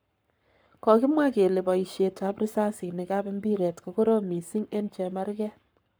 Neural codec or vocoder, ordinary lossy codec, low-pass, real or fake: codec, 44.1 kHz, 7.8 kbps, Pupu-Codec; none; none; fake